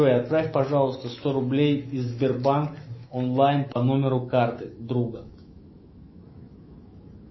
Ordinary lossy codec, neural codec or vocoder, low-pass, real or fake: MP3, 24 kbps; autoencoder, 48 kHz, 128 numbers a frame, DAC-VAE, trained on Japanese speech; 7.2 kHz; fake